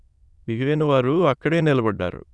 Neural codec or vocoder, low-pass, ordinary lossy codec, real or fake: autoencoder, 22.05 kHz, a latent of 192 numbers a frame, VITS, trained on many speakers; none; none; fake